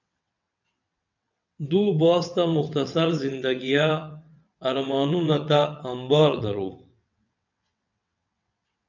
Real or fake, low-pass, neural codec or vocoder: fake; 7.2 kHz; vocoder, 22.05 kHz, 80 mel bands, WaveNeXt